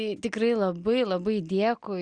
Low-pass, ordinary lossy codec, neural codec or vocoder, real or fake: 9.9 kHz; Opus, 64 kbps; none; real